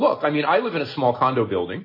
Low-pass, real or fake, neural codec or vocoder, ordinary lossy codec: 5.4 kHz; real; none; MP3, 24 kbps